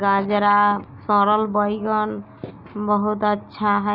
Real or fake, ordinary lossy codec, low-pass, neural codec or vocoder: real; none; 5.4 kHz; none